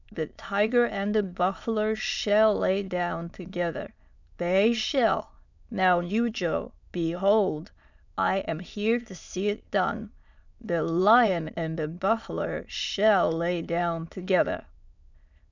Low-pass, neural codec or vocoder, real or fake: 7.2 kHz; autoencoder, 22.05 kHz, a latent of 192 numbers a frame, VITS, trained on many speakers; fake